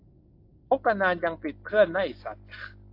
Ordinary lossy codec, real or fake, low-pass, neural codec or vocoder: AAC, 32 kbps; real; 5.4 kHz; none